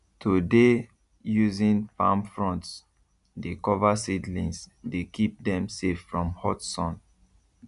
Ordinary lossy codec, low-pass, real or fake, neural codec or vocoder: none; 10.8 kHz; real; none